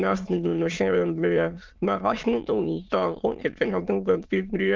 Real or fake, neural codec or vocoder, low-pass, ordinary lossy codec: fake; autoencoder, 22.05 kHz, a latent of 192 numbers a frame, VITS, trained on many speakers; 7.2 kHz; Opus, 32 kbps